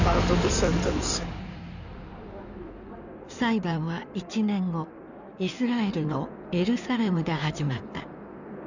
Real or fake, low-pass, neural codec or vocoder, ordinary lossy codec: fake; 7.2 kHz; codec, 16 kHz in and 24 kHz out, 2.2 kbps, FireRedTTS-2 codec; none